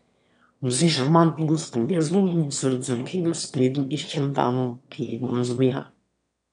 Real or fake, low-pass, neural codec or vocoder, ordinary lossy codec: fake; 9.9 kHz; autoencoder, 22.05 kHz, a latent of 192 numbers a frame, VITS, trained on one speaker; none